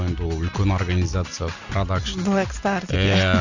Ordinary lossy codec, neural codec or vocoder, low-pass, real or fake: none; none; 7.2 kHz; real